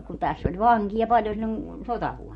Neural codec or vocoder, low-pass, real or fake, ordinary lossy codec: none; 19.8 kHz; real; MP3, 48 kbps